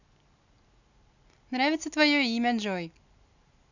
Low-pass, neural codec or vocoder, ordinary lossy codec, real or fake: 7.2 kHz; none; none; real